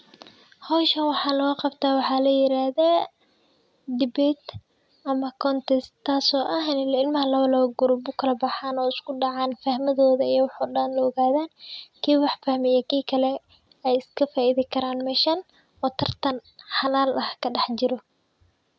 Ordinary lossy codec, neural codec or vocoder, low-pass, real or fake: none; none; none; real